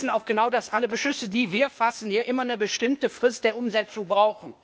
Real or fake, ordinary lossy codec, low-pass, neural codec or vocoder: fake; none; none; codec, 16 kHz, 0.8 kbps, ZipCodec